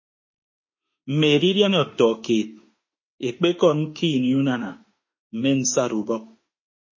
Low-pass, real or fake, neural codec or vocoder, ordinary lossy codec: 7.2 kHz; fake; autoencoder, 48 kHz, 32 numbers a frame, DAC-VAE, trained on Japanese speech; MP3, 32 kbps